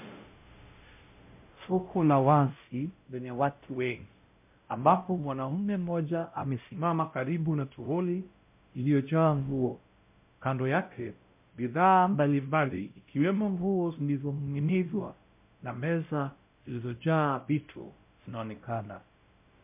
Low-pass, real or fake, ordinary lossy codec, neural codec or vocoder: 3.6 kHz; fake; MP3, 32 kbps; codec, 16 kHz, 0.5 kbps, X-Codec, WavLM features, trained on Multilingual LibriSpeech